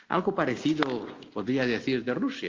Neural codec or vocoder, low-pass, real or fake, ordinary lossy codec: none; 7.2 kHz; real; Opus, 32 kbps